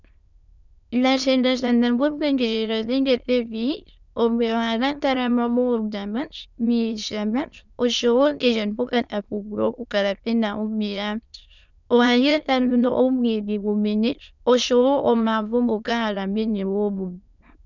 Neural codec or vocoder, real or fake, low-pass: autoencoder, 22.05 kHz, a latent of 192 numbers a frame, VITS, trained on many speakers; fake; 7.2 kHz